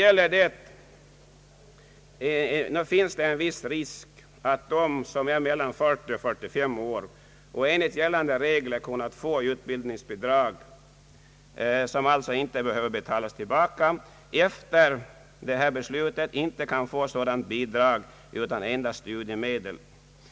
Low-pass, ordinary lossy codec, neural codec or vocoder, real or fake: none; none; none; real